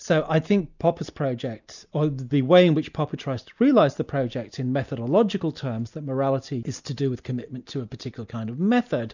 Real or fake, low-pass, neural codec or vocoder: real; 7.2 kHz; none